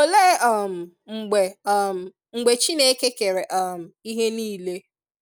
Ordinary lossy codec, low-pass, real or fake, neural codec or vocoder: none; none; real; none